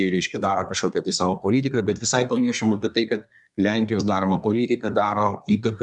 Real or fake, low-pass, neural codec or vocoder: fake; 10.8 kHz; codec, 24 kHz, 1 kbps, SNAC